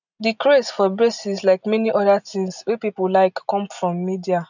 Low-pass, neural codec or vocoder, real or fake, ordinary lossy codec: 7.2 kHz; none; real; none